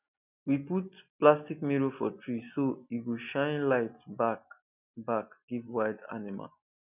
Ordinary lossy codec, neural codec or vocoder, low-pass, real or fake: none; none; 3.6 kHz; real